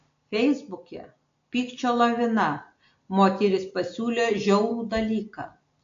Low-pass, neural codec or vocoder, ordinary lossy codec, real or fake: 7.2 kHz; none; AAC, 48 kbps; real